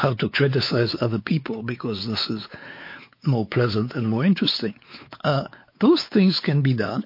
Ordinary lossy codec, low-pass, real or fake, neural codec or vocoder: MP3, 32 kbps; 5.4 kHz; fake; codec, 16 kHz, 4 kbps, X-Codec, WavLM features, trained on Multilingual LibriSpeech